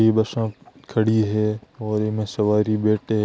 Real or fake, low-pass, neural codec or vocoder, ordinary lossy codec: real; none; none; none